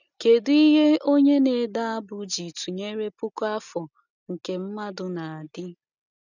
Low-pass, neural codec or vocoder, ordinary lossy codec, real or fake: 7.2 kHz; none; none; real